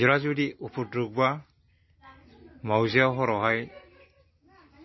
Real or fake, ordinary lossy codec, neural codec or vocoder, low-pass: real; MP3, 24 kbps; none; 7.2 kHz